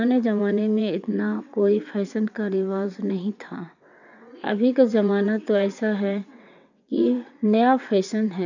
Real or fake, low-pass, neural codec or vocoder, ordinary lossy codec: fake; 7.2 kHz; vocoder, 22.05 kHz, 80 mel bands, WaveNeXt; none